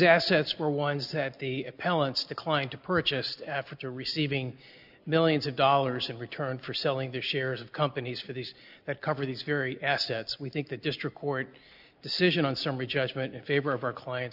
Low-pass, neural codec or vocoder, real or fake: 5.4 kHz; none; real